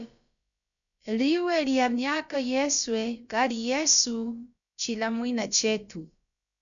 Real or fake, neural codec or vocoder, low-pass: fake; codec, 16 kHz, about 1 kbps, DyCAST, with the encoder's durations; 7.2 kHz